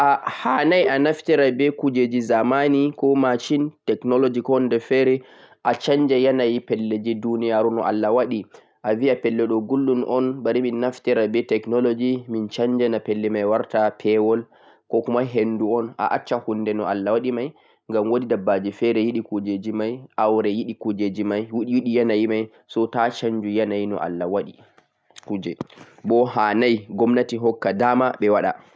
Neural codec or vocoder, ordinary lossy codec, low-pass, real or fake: none; none; none; real